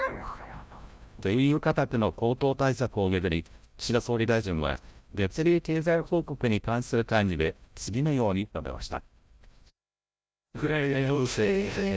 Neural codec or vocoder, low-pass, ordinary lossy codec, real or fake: codec, 16 kHz, 0.5 kbps, FreqCodec, larger model; none; none; fake